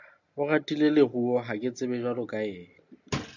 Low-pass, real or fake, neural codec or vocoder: 7.2 kHz; real; none